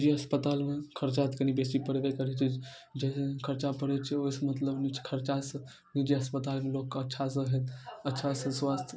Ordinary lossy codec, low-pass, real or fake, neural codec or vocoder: none; none; real; none